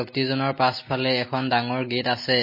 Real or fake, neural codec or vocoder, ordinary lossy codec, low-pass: real; none; MP3, 24 kbps; 5.4 kHz